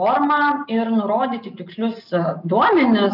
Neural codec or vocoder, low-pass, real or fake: none; 5.4 kHz; real